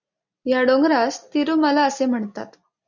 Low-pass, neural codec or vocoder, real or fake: 7.2 kHz; none; real